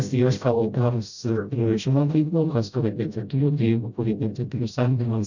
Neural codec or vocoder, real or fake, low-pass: codec, 16 kHz, 0.5 kbps, FreqCodec, smaller model; fake; 7.2 kHz